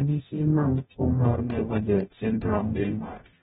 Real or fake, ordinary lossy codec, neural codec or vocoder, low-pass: fake; AAC, 16 kbps; codec, 44.1 kHz, 0.9 kbps, DAC; 19.8 kHz